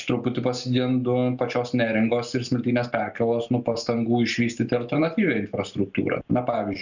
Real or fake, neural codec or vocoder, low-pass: real; none; 7.2 kHz